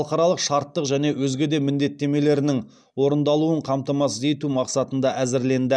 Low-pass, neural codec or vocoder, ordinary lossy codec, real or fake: none; none; none; real